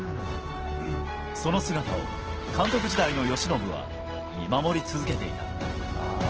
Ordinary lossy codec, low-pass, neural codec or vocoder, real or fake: Opus, 16 kbps; 7.2 kHz; none; real